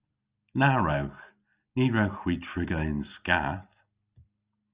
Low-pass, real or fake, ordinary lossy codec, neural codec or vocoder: 3.6 kHz; real; Opus, 64 kbps; none